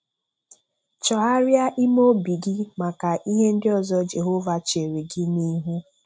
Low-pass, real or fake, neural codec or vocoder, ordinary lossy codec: none; real; none; none